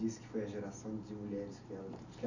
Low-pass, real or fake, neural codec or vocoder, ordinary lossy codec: 7.2 kHz; real; none; none